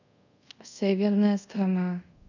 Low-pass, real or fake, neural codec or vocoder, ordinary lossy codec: 7.2 kHz; fake; codec, 24 kHz, 0.5 kbps, DualCodec; none